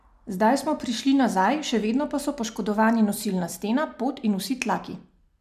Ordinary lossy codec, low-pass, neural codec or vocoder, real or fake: none; 14.4 kHz; none; real